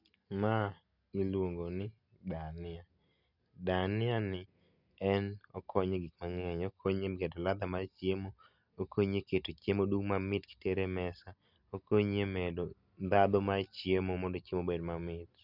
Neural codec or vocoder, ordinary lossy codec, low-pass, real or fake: none; none; 5.4 kHz; real